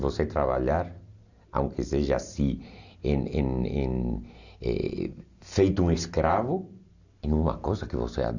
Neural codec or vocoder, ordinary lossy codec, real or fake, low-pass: none; none; real; 7.2 kHz